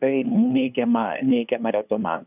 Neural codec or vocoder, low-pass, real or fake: codec, 16 kHz, 2 kbps, FunCodec, trained on LibriTTS, 25 frames a second; 3.6 kHz; fake